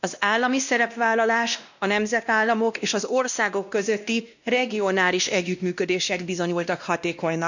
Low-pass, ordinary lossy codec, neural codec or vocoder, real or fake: 7.2 kHz; none; codec, 16 kHz, 1 kbps, X-Codec, WavLM features, trained on Multilingual LibriSpeech; fake